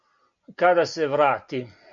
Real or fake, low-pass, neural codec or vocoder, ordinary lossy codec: real; 7.2 kHz; none; MP3, 96 kbps